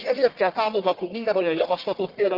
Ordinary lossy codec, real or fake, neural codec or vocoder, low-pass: Opus, 32 kbps; fake; codec, 44.1 kHz, 1.7 kbps, Pupu-Codec; 5.4 kHz